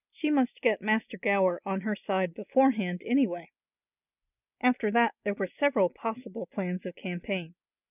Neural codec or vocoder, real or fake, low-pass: none; real; 3.6 kHz